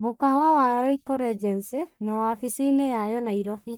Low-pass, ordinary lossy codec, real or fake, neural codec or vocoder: none; none; fake; codec, 44.1 kHz, 1.7 kbps, Pupu-Codec